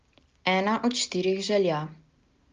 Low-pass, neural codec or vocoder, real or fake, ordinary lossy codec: 7.2 kHz; none; real; Opus, 32 kbps